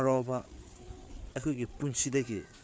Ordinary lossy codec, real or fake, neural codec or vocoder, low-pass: none; fake; codec, 16 kHz, 4 kbps, FreqCodec, larger model; none